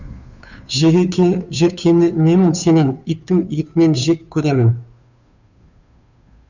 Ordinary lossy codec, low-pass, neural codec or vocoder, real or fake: none; 7.2 kHz; codec, 16 kHz, 2 kbps, FunCodec, trained on Chinese and English, 25 frames a second; fake